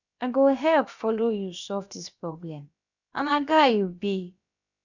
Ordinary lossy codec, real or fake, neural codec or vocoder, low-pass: none; fake; codec, 16 kHz, about 1 kbps, DyCAST, with the encoder's durations; 7.2 kHz